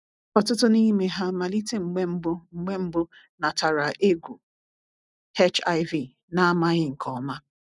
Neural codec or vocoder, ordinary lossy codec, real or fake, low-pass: none; none; real; 10.8 kHz